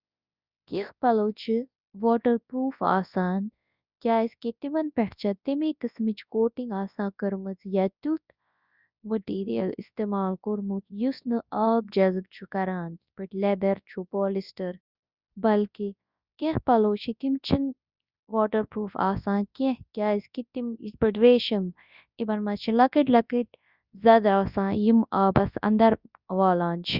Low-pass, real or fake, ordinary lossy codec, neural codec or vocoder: 5.4 kHz; fake; Opus, 64 kbps; codec, 24 kHz, 0.9 kbps, WavTokenizer, large speech release